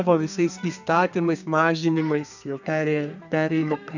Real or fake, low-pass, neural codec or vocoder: fake; 7.2 kHz; codec, 32 kHz, 1.9 kbps, SNAC